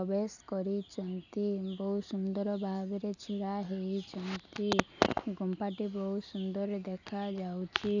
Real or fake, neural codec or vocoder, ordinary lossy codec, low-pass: real; none; none; 7.2 kHz